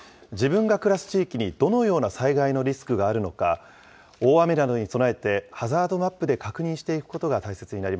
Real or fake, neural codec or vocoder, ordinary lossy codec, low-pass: real; none; none; none